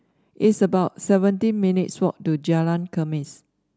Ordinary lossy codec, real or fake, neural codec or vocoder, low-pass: none; real; none; none